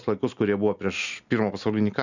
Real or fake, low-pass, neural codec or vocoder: real; 7.2 kHz; none